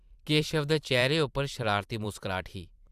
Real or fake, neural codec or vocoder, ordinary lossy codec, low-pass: fake; vocoder, 44.1 kHz, 128 mel bands every 512 samples, BigVGAN v2; Opus, 64 kbps; 14.4 kHz